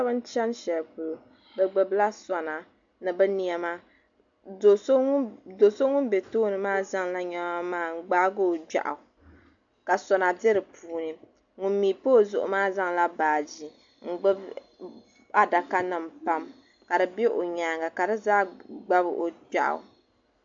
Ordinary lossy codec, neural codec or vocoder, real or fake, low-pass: AAC, 64 kbps; none; real; 7.2 kHz